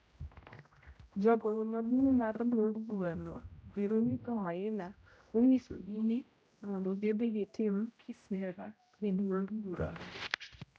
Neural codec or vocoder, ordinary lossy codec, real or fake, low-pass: codec, 16 kHz, 0.5 kbps, X-Codec, HuBERT features, trained on general audio; none; fake; none